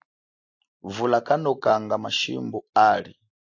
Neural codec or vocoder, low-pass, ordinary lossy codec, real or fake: none; 7.2 kHz; AAC, 48 kbps; real